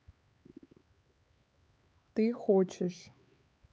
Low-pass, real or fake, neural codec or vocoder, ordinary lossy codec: none; fake; codec, 16 kHz, 4 kbps, X-Codec, HuBERT features, trained on LibriSpeech; none